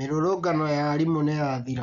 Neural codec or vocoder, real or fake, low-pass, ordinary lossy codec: none; real; 7.2 kHz; none